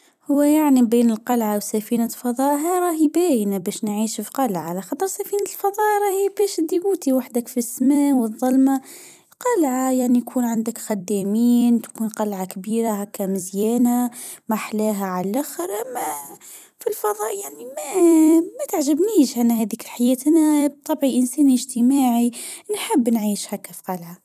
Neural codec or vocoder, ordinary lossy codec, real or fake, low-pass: vocoder, 44.1 kHz, 128 mel bands every 256 samples, BigVGAN v2; none; fake; 14.4 kHz